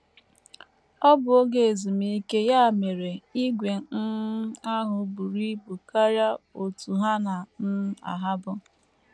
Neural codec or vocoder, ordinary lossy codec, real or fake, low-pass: none; none; real; none